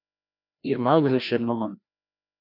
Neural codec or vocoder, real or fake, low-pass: codec, 16 kHz, 1 kbps, FreqCodec, larger model; fake; 5.4 kHz